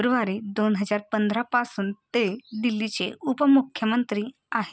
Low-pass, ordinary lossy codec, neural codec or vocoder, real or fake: none; none; none; real